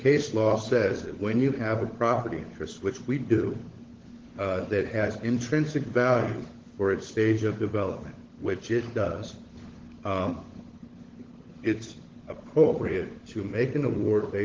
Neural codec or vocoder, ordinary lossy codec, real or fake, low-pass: codec, 16 kHz, 16 kbps, FunCodec, trained on LibriTTS, 50 frames a second; Opus, 16 kbps; fake; 7.2 kHz